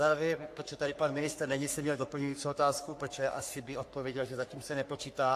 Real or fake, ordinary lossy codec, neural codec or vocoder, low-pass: fake; AAC, 64 kbps; codec, 44.1 kHz, 3.4 kbps, Pupu-Codec; 14.4 kHz